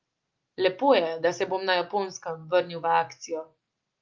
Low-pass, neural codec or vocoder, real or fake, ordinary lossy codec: 7.2 kHz; none; real; Opus, 32 kbps